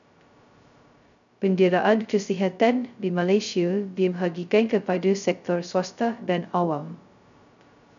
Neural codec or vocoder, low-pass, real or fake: codec, 16 kHz, 0.2 kbps, FocalCodec; 7.2 kHz; fake